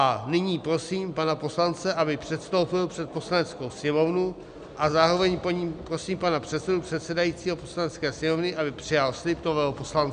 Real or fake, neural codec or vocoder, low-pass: real; none; 9.9 kHz